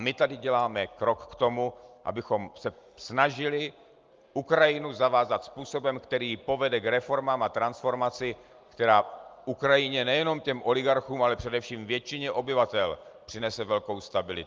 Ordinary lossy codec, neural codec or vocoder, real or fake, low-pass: Opus, 32 kbps; none; real; 7.2 kHz